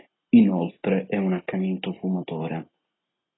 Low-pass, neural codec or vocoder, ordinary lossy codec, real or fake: 7.2 kHz; none; AAC, 16 kbps; real